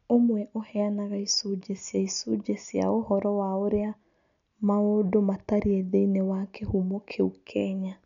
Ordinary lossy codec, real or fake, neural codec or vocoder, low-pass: none; real; none; 7.2 kHz